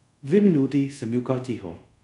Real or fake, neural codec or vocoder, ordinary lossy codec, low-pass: fake; codec, 24 kHz, 0.5 kbps, DualCodec; none; 10.8 kHz